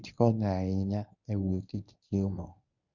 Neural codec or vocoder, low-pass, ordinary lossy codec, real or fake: codec, 24 kHz, 0.9 kbps, WavTokenizer, medium speech release version 1; 7.2 kHz; none; fake